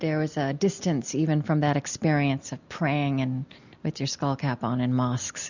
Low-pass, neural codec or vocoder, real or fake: 7.2 kHz; none; real